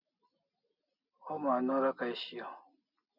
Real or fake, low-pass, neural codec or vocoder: real; 5.4 kHz; none